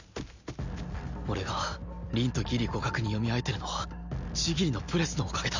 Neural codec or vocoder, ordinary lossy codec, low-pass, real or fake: none; none; 7.2 kHz; real